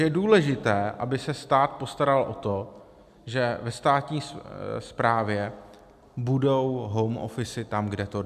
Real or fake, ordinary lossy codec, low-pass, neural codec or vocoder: real; AAC, 96 kbps; 14.4 kHz; none